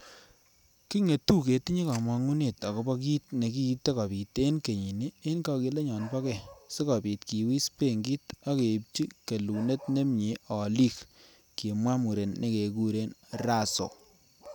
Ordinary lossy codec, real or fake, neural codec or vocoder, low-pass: none; real; none; none